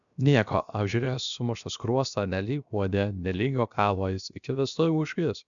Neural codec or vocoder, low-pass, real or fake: codec, 16 kHz, 0.7 kbps, FocalCodec; 7.2 kHz; fake